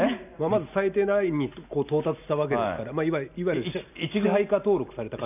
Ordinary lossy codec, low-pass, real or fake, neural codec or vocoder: none; 3.6 kHz; real; none